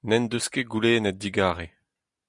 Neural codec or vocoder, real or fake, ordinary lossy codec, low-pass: none; real; Opus, 64 kbps; 10.8 kHz